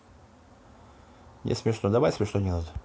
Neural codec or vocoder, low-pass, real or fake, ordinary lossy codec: none; none; real; none